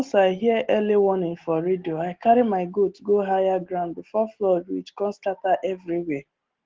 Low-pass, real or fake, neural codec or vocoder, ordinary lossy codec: 7.2 kHz; real; none; Opus, 16 kbps